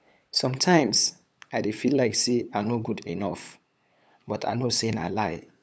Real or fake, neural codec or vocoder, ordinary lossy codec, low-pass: fake; codec, 16 kHz, 8 kbps, FunCodec, trained on LibriTTS, 25 frames a second; none; none